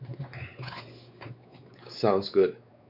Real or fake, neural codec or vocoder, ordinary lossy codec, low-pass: fake; codec, 16 kHz, 4 kbps, X-Codec, WavLM features, trained on Multilingual LibriSpeech; none; 5.4 kHz